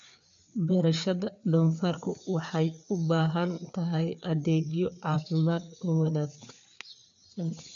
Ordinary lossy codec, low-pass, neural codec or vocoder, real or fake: none; 7.2 kHz; codec, 16 kHz, 4 kbps, FreqCodec, larger model; fake